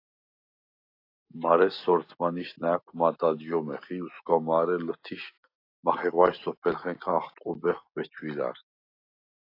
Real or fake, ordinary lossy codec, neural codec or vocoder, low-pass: real; AAC, 32 kbps; none; 5.4 kHz